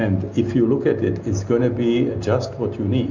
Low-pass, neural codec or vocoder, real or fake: 7.2 kHz; none; real